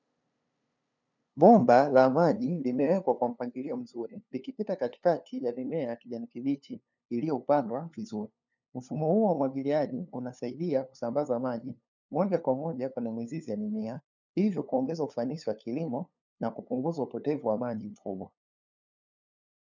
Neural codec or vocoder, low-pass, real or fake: codec, 16 kHz, 2 kbps, FunCodec, trained on LibriTTS, 25 frames a second; 7.2 kHz; fake